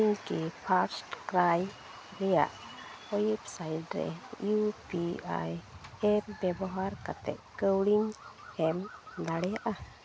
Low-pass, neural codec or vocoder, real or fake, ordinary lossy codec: none; none; real; none